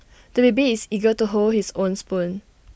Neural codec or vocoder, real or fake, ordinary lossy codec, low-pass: none; real; none; none